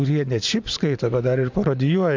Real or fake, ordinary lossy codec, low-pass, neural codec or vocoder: fake; MP3, 64 kbps; 7.2 kHz; vocoder, 44.1 kHz, 128 mel bands every 512 samples, BigVGAN v2